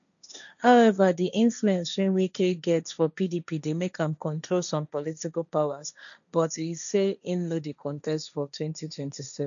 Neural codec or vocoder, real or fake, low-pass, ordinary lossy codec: codec, 16 kHz, 1.1 kbps, Voila-Tokenizer; fake; 7.2 kHz; none